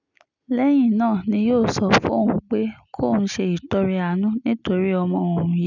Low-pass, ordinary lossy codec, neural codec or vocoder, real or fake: 7.2 kHz; none; none; real